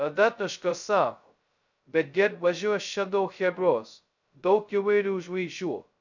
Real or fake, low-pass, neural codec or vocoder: fake; 7.2 kHz; codec, 16 kHz, 0.2 kbps, FocalCodec